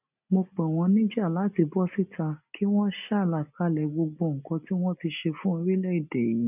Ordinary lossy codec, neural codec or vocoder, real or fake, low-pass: none; none; real; 3.6 kHz